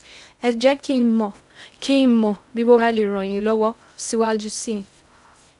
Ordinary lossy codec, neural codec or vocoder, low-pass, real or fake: none; codec, 16 kHz in and 24 kHz out, 0.6 kbps, FocalCodec, streaming, 2048 codes; 10.8 kHz; fake